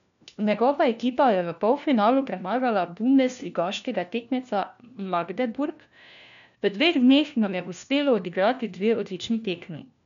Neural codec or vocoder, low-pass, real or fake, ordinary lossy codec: codec, 16 kHz, 1 kbps, FunCodec, trained on LibriTTS, 50 frames a second; 7.2 kHz; fake; MP3, 96 kbps